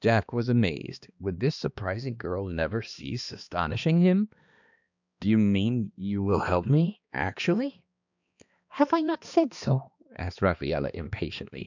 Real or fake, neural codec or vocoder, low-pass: fake; codec, 16 kHz, 2 kbps, X-Codec, HuBERT features, trained on balanced general audio; 7.2 kHz